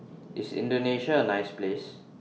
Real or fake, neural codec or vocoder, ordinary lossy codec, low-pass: real; none; none; none